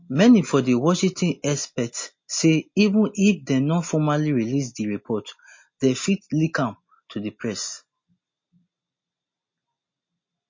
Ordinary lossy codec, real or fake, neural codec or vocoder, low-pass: MP3, 32 kbps; real; none; 7.2 kHz